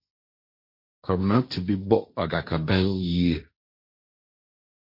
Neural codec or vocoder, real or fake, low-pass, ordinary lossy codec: codec, 16 kHz, 1.1 kbps, Voila-Tokenizer; fake; 5.4 kHz; MP3, 32 kbps